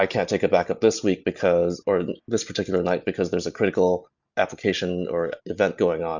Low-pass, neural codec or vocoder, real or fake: 7.2 kHz; none; real